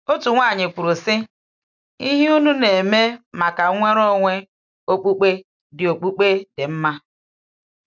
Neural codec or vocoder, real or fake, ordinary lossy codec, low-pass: none; real; none; 7.2 kHz